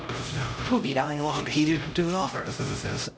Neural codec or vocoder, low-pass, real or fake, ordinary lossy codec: codec, 16 kHz, 0.5 kbps, X-Codec, HuBERT features, trained on LibriSpeech; none; fake; none